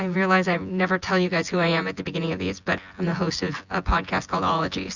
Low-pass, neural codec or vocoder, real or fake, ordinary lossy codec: 7.2 kHz; vocoder, 24 kHz, 100 mel bands, Vocos; fake; Opus, 64 kbps